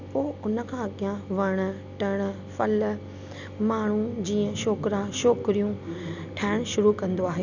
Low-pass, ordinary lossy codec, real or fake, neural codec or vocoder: 7.2 kHz; none; real; none